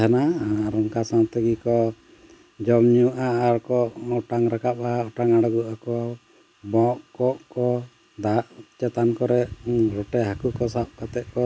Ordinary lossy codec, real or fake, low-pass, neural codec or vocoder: none; real; none; none